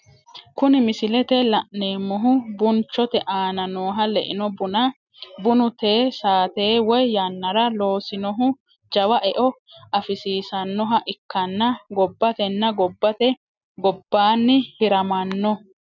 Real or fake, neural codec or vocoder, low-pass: real; none; 7.2 kHz